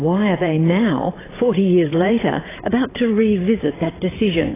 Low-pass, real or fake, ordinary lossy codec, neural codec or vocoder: 3.6 kHz; fake; AAC, 16 kbps; codec, 16 kHz, 8 kbps, FreqCodec, larger model